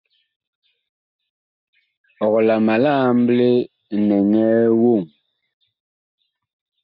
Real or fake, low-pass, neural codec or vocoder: real; 5.4 kHz; none